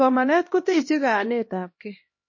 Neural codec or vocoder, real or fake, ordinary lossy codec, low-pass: codec, 16 kHz, 1 kbps, X-Codec, HuBERT features, trained on LibriSpeech; fake; MP3, 32 kbps; 7.2 kHz